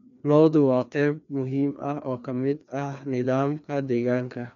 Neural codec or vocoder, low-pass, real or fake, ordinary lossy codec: codec, 16 kHz, 2 kbps, FreqCodec, larger model; 7.2 kHz; fake; none